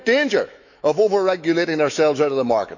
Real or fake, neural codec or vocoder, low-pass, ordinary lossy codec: fake; autoencoder, 48 kHz, 128 numbers a frame, DAC-VAE, trained on Japanese speech; 7.2 kHz; none